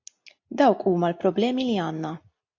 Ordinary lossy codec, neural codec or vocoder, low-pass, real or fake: AAC, 48 kbps; none; 7.2 kHz; real